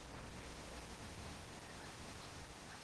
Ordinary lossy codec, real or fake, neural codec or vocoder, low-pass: Opus, 16 kbps; fake; codec, 16 kHz in and 24 kHz out, 0.8 kbps, FocalCodec, streaming, 65536 codes; 10.8 kHz